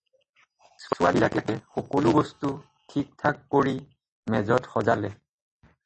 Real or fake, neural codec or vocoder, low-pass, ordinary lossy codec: real; none; 10.8 kHz; MP3, 32 kbps